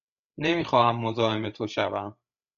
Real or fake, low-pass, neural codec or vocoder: fake; 7.2 kHz; codec, 16 kHz, 8 kbps, FreqCodec, larger model